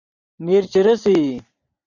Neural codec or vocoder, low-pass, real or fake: vocoder, 44.1 kHz, 128 mel bands every 512 samples, BigVGAN v2; 7.2 kHz; fake